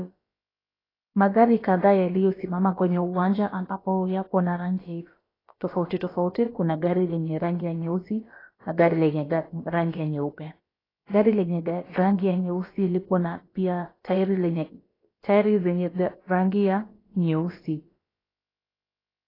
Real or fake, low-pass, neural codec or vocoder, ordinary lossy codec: fake; 5.4 kHz; codec, 16 kHz, about 1 kbps, DyCAST, with the encoder's durations; AAC, 24 kbps